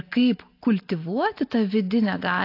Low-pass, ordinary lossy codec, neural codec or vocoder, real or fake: 5.4 kHz; AAC, 32 kbps; none; real